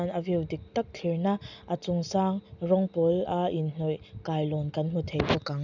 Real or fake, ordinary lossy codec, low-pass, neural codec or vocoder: real; none; 7.2 kHz; none